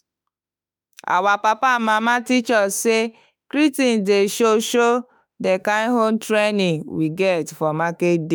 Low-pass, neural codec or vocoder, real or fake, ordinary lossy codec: none; autoencoder, 48 kHz, 32 numbers a frame, DAC-VAE, trained on Japanese speech; fake; none